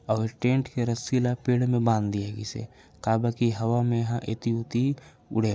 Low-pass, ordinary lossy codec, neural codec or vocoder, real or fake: none; none; none; real